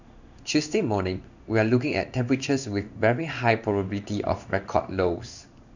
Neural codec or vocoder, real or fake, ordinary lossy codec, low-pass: codec, 16 kHz in and 24 kHz out, 1 kbps, XY-Tokenizer; fake; none; 7.2 kHz